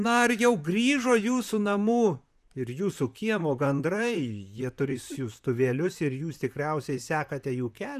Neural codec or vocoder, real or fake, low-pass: vocoder, 44.1 kHz, 128 mel bands, Pupu-Vocoder; fake; 14.4 kHz